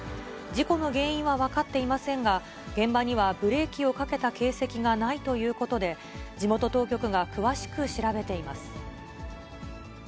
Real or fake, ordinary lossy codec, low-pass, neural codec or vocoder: real; none; none; none